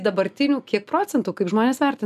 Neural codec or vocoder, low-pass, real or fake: none; 14.4 kHz; real